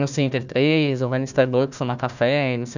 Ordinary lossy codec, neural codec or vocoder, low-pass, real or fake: none; codec, 16 kHz, 1 kbps, FunCodec, trained on Chinese and English, 50 frames a second; 7.2 kHz; fake